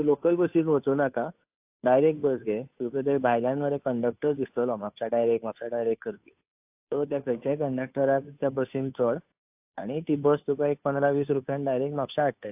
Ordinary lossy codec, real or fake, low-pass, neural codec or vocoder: AAC, 32 kbps; fake; 3.6 kHz; codec, 16 kHz, 2 kbps, FunCodec, trained on Chinese and English, 25 frames a second